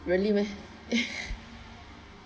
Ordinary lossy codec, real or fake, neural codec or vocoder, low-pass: none; real; none; none